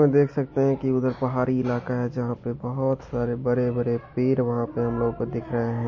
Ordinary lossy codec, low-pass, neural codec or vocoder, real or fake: MP3, 32 kbps; 7.2 kHz; none; real